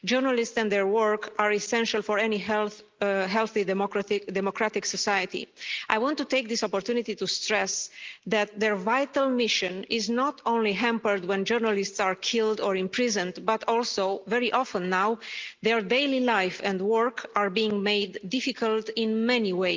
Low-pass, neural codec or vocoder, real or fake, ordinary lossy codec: 7.2 kHz; none; real; Opus, 32 kbps